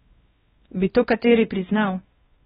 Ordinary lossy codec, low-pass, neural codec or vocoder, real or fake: AAC, 16 kbps; 7.2 kHz; codec, 16 kHz, 1 kbps, X-Codec, WavLM features, trained on Multilingual LibriSpeech; fake